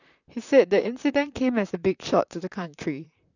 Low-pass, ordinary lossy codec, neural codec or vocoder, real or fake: 7.2 kHz; none; vocoder, 44.1 kHz, 128 mel bands, Pupu-Vocoder; fake